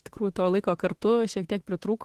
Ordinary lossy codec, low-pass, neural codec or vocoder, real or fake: Opus, 16 kbps; 14.4 kHz; autoencoder, 48 kHz, 32 numbers a frame, DAC-VAE, trained on Japanese speech; fake